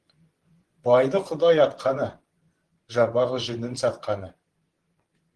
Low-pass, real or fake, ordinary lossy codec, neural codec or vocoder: 10.8 kHz; fake; Opus, 16 kbps; vocoder, 24 kHz, 100 mel bands, Vocos